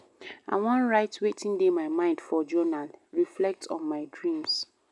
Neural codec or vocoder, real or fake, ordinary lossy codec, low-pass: none; real; none; 10.8 kHz